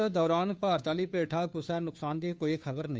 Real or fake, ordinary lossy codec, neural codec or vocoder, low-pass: fake; none; codec, 16 kHz, 2 kbps, FunCodec, trained on Chinese and English, 25 frames a second; none